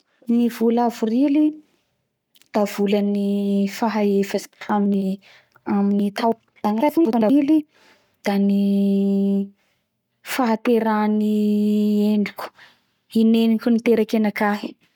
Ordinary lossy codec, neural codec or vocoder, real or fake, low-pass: none; codec, 44.1 kHz, 7.8 kbps, Pupu-Codec; fake; 19.8 kHz